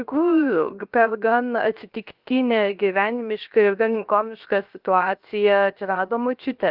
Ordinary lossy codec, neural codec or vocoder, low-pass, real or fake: Opus, 32 kbps; codec, 16 kHz, 0.7 kbps, FocalCodec; 5.4 kHz; fake